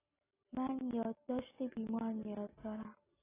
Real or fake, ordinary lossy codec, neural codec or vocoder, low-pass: real; AAC, 16 kbps; none; 3.6 kHz